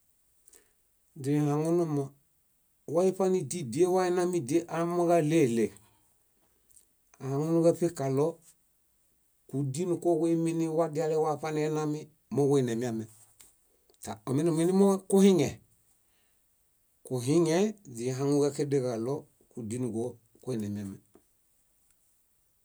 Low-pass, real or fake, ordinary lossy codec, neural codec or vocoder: none; real; none; none